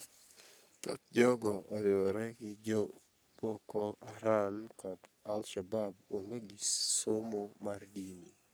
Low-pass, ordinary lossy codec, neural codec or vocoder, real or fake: none; none; codec, 44.1 kHz, 3.4 kbps, Pupu-Codec; fake